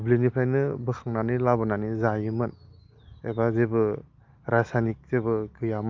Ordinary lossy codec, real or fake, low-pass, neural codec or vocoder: Opus, 24 kbps; real; 7.2 kHz; none